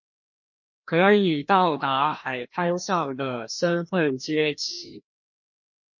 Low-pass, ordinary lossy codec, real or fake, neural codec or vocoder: 7.2 kHz; MP3, 48 kbps; fake; codec, 16 kHz, 1 kbps, FreqCodec, larger model